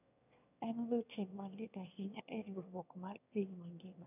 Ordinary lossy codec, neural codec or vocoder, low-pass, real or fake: none; autoencoder, 22.05 kHz, a latent of 192 numbers a frame, VITS, trained on one speaker; 3.6 kHz; fake